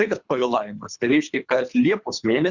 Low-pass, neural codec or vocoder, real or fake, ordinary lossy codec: 7.2 kHz; codec, 24 kHz, 3 kbps, HILCodec; fake; Opus, 64 kbps